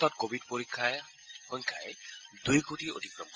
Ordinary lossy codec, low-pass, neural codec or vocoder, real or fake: Opus, 24 kbps; 7.2 kHz; none; real